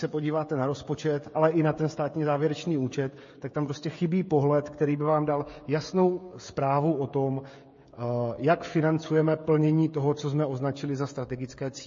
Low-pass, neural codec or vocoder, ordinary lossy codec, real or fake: 7.2 kHz; codec, 16 kHz, 16 kbps, FreqCodec, smaller model; MP3, 32 kbps; fake